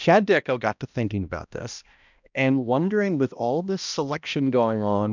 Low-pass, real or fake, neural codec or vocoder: 7.2 kHz; fake; codec, 16 kHz, 1 kbps, X-Codec, HuBERT features, trained on balanced general audio